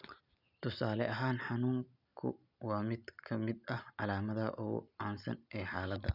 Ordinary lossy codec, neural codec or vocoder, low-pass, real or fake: none; none; 5.4 kHz; real